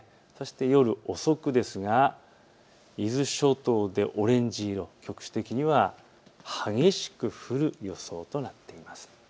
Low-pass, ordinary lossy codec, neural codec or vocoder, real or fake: none; none; none; real